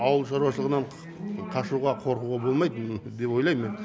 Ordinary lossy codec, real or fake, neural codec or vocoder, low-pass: none; real; none; none